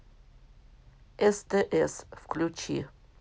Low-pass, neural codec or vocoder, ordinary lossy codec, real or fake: none; none; none; real